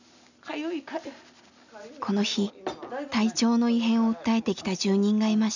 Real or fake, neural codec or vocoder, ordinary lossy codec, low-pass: real; none; none; 7.2 kHz